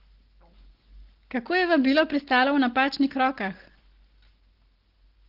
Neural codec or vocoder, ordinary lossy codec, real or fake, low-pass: none; Opus, 16 kbps; real; 5.4 kHz